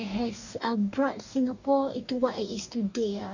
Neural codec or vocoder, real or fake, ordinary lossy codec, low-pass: codec, 44.1 kHz, 2.6 kbps, DAC; fake; none; 7.2 kHz